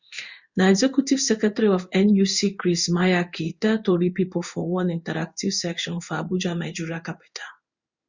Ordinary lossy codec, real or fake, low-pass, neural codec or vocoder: Opus, 64 kbps; fake; 7.2 kHz; codec, 16 kHz in and 24 kHz out, 1 kbps, XY-Tokenizer